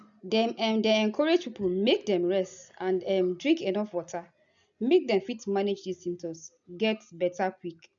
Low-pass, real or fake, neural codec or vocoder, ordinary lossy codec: 7.2 kHz; real; none; none